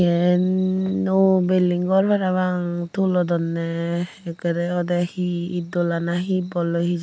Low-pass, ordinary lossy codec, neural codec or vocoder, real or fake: none; none; none; real